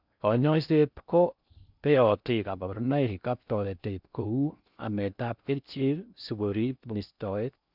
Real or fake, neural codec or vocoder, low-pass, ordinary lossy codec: fake; codec, 16 kHz in and 24 kHz out, 0.6 kbps, FocalCodec, streaming, 2048 codes; 5.4 kHz; none